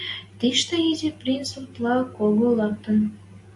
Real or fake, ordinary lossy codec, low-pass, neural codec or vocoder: real; AAC, 64 kbps; 10.8 kHz; none